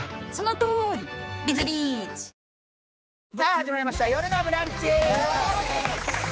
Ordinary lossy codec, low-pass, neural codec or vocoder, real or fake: none; none; codec, 16 kHz, 4 kbps, X-Codec, HuBERT features, trained on general audio; fake